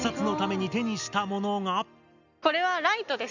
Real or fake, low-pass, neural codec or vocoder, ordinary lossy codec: real; 7.2 kHz; none; none